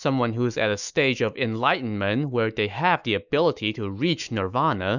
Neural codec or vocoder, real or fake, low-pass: autoencoder, 48 kHz, 128 numbers a frame, DAC-VAE, trained on Japanese speech; fake; 7.2 kHz